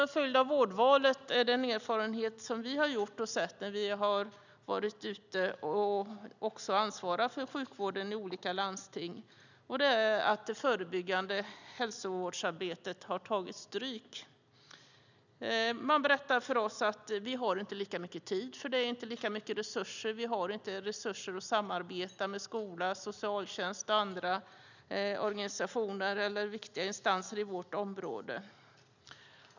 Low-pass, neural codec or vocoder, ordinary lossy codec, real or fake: 7.2 kHz; none; none; real